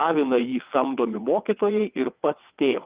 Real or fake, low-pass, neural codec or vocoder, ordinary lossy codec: fake; 3.6 kHz; vocoder, 22.05 kHz, 80 mel bands, WaveNeXt; Opus, 24 kbps